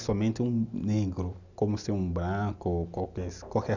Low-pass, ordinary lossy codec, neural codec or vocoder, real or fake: 7.2 kHz; none; none; real